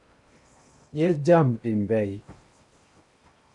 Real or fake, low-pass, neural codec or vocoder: fake; 10.8 kHz; codec, 16 kHz in and 24 kHz out, 0.8 kbps, FocalCodec, streaming, 65536 codes